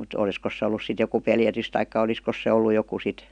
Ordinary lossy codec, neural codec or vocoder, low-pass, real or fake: none; none; 9.9 kHz; real